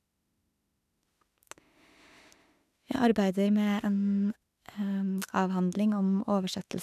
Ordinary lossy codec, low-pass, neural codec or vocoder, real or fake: none; 14.4 kHz; autoencoder, 48 kHz, 32 numbers a frame, DAC-VAE, trained on Japanese speech; fake